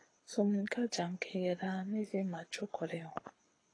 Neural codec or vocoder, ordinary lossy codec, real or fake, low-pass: codec, 24 kHz, 6 kbps, HILCodec; AAC, 32 kbps; fake; 9.9 kHz